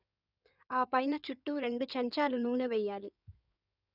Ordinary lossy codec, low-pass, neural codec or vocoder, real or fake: none; 5.4 kHz; codec, 16 kHz in and 24 kHz out, 2.2 kbps, FireRedTTS-2 codec; fake